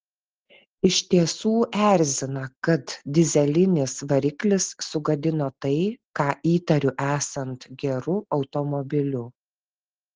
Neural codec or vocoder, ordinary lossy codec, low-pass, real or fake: none; Opus, 16 kbps; 7.2 kHz; real